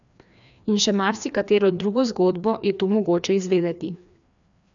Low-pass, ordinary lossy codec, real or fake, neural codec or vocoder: 7.2 kHz; none; fake; codec, 16 kHz, 2 kbps, FreqCodec, larger model